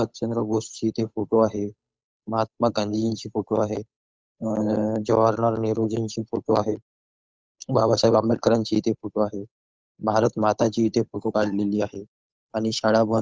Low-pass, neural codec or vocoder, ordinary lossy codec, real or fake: none; codec, 16 kHz, 8 kbps, FunCodec, trained on Chinese and English, 25 frames a second; none; fake